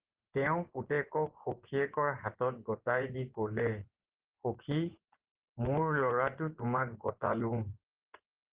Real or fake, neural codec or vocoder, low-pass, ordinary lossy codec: fake; vocoder, 24 kHz, 100 mel bands, Vocos; 3.6 kHz; Opus, 16 kbps